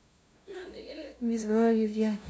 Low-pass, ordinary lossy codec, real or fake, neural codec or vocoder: none; none; fake; codec, 16 kHz, 0.5 kbps, FunCodec, trained on LibriTTS, 25 frames a second